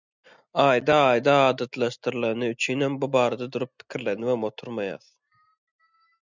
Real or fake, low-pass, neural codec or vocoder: real; 7.2 kHz; none